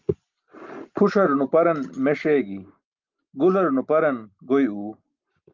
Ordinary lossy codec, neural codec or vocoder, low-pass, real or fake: Opus, 24 kbps; none; 7.2 kHz; real